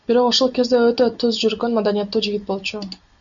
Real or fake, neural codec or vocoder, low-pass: real; none; 7.2 kHz